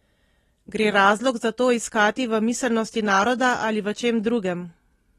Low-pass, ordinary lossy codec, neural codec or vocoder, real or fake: 19.8 kHz; AAC, 32 kbps; none; real